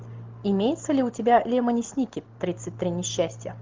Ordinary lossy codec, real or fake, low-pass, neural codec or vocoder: Opus, 32 kbps; real; 7.2 kHz; none